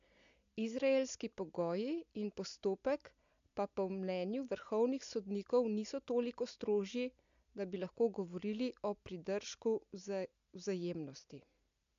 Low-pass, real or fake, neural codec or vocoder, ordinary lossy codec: 7.2 kHz; real; none; AAC, 64 kbps